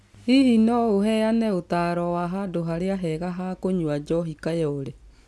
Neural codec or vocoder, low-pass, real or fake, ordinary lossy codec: none; none; real; none